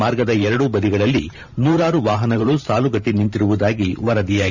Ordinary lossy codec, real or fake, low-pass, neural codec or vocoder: none; fake; 7.2 kHz; vocoder, 44.1 kHz, 128 mel bands every 512 samples, BigVGAN v2